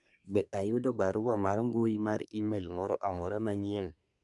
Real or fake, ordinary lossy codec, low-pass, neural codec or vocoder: fake; none; 10.8 kHz; codec, 24 kHz, 1 kbps, SNAC